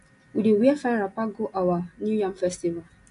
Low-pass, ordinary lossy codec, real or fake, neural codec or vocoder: 10.8 kHz; AAC, 48 kbps; real; none